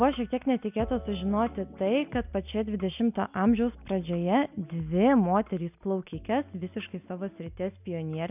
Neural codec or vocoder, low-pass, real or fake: none; 3.6 kHz; real